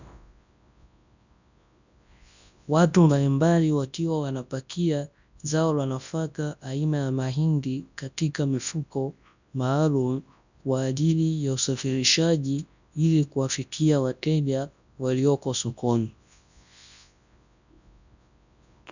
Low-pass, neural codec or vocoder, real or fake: 7.2 kHz; codec, 24 kHz, 0.9 kbps, WavTokenizer, large speech release; fake